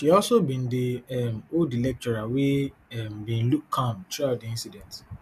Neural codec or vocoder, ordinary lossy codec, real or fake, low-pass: none; none; real; 14.4 kHz